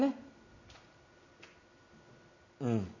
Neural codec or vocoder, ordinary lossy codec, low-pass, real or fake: none; none; 7.2 kHz; real